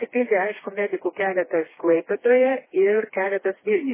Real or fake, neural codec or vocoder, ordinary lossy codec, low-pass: fake; codec, 16 kHz, 2 kbps, FreqCodec, smaller model; MP3, 16 kbps; 3.6 kHz